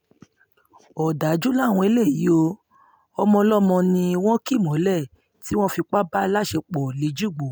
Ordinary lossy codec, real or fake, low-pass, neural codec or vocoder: none; real; none; none